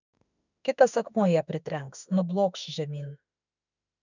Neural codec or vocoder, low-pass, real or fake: autoencoder, 48 kHz, 32 numbers a frame, DAC-VAE, trained on Japanese speech; 7.2 kHz; fake